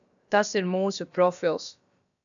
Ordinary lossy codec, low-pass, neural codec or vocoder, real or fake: MP3, 96 kbps; 7.2 kHz; codec, 16 kHz, about 1 kbps, DyCAST, with the encoder's durations; fake